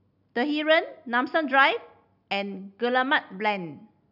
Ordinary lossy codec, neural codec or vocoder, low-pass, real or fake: none; none; 5.4 kHz; real